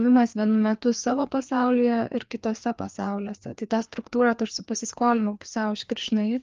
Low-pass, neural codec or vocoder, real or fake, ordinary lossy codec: 7.2 kHz; codec, 16 kHz, 2 kbps, FreqCodec, larger model; fake; Opus, 32 kbps